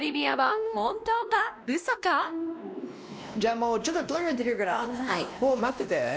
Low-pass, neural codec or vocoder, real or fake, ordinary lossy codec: none; codec, 16 kHz, 1 kbps, X-Codec, WavLM features, trained on Multilingual LibriSpeech; fake; none